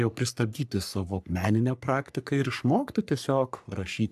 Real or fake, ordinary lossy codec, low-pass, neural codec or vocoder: fake; AAC, 96 kbps; 14.4 kHz; codec, 44.1 kHz, 3.4 kbps, Pupu-Codec